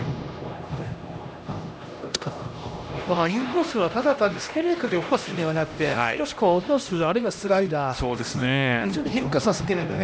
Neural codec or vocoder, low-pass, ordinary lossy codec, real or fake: codec, 16 kHz, 1 kbps, X-Codec, HuBERT features, trained on LibriSpeech; none; none; fake